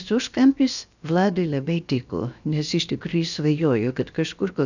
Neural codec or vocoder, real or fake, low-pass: codec, 16 kHz, about 1 kbps, DyCAST, with the encoder's durations; fake; 7.2 kHz